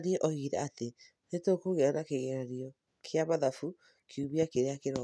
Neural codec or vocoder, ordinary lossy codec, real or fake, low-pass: none; none; real; none